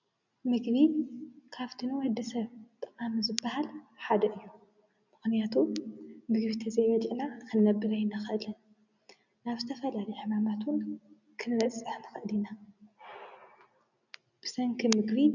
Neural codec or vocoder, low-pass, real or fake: none; 7.2 kHz; real